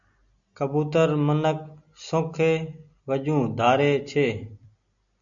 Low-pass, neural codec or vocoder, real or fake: 7.2 kHz; none; real